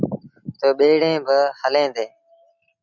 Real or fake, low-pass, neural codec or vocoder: real; 7.2 kHz; none